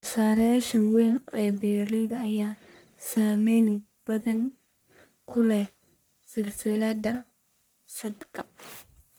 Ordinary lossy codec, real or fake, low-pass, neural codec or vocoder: none; fake; none; codec, 44.1 kHz, 1.7 kbps, Pupu-Codec